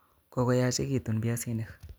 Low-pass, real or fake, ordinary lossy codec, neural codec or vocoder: none; real; none; none